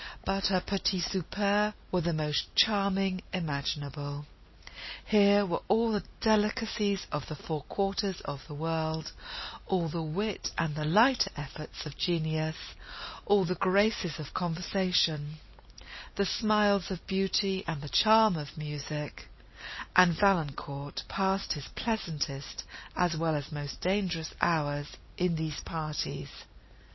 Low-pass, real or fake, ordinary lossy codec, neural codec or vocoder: 7.2 kHz; real; MP3, 24 kbps; none